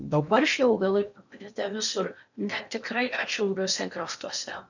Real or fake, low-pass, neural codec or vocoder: fake; 7.2 kHz; codec, 16 kHz in and 24 kHz out, 0.8 kbps, FocalCodec, streaming, 65536 codes